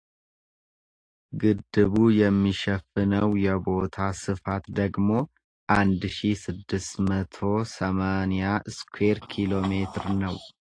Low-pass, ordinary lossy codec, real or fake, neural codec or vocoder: 9.9 kHz; AAC, 48 kbps; real; none